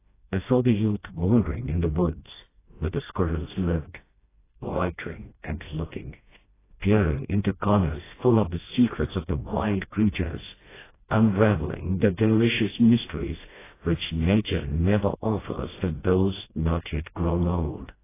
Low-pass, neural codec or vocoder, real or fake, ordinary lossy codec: 3.6 kHz; codec, 16 kHz, 1 kbps, FreqCodec, smaller model; fake; AAC, 16 kbps